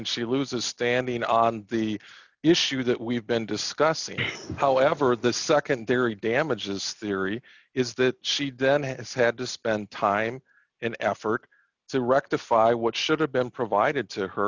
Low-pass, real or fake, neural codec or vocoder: 7.2 kHz; real; none